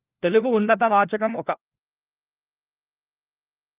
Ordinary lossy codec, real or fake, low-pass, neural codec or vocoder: Opus, 32 kbps; fake; 3.6 kHz; codec, 16 kHz, 1 kbps, FunCodec, trained on LibriTTS, 50 frames a second